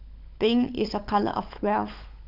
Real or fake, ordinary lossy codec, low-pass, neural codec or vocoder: fake; none; 5.4 kHz; codec, 16 kHz, 16 kbps, FunCodec, trained on LibriTTS, 50 frames a second